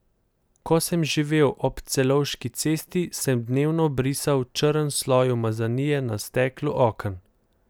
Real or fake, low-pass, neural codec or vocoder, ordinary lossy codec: real; none; none; none